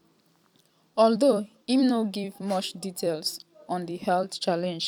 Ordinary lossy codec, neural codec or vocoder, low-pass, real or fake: none; vocoder, 44.1 kHz, 128 mel bands every 256 samples, BigVGAN v2; 19.8 kHz; fake